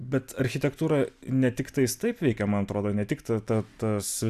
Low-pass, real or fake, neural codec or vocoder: 14.4 kHz; real; none